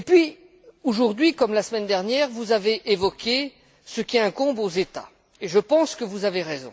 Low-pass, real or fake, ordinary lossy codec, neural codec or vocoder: none; real; none; none